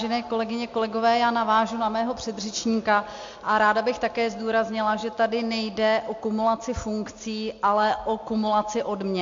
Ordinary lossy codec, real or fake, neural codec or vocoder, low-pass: MP3, 48 kbps; real; none; 7.2 kHz